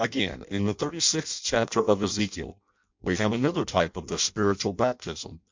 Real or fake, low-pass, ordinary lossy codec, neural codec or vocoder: fake; 7.2 kHz; MP3, 64 kbps; codec, 16 kHz in and 24 kHz out, 0.6 kbps, FireRedTTS-2 codec